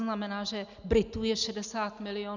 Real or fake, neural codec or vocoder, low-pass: real; none; 7.2 kHz